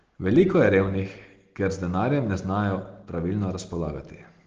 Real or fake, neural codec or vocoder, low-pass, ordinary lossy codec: real; none; 7.2 kHz; Opus, 16 kbps